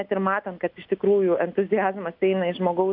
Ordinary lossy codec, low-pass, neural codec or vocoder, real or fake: AAC, 48 kbps; 5.4 kHz; none; real